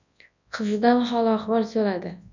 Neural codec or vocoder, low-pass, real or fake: codec, 24 kHz, 0.9 kbps, WavTokenizer, large speech release; 7.2 kHz; fake